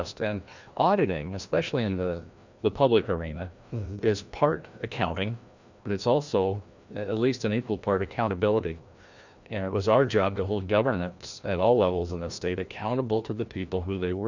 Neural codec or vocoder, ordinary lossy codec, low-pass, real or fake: codec, 16 kHz, 1 kbps, FreqCodec, larger model; Opus, 64 kbps; 7.2 kHz; fake